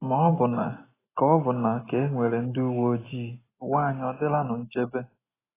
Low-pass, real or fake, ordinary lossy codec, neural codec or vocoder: 3.6 kHz; real; AAC, 16 kbps; none